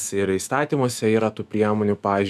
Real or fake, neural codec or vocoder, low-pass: fake; autoencoder, 48 kHz, 128 numbers a frame, DAC-VAE, trained on Japanese speech; 14.4 kHz